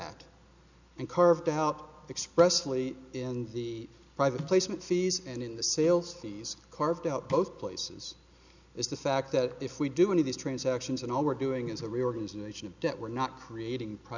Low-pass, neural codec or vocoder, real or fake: 7.2 kHz; none; real